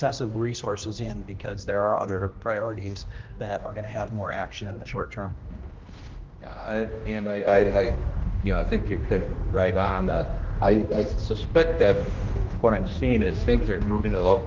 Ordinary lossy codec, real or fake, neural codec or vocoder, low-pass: Opus, 32 kbps; fake; codec, 16 kHz, 1 kbps, X-Codec, HuBERT features, trained on general audio; 7.2 kHz